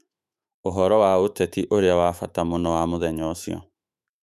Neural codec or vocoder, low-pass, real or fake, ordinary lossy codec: autoencoder, 48 kHz, 128 numbers a frame, DAC-VAE, trained on Japanese speech; 14.4 kHz; fake; none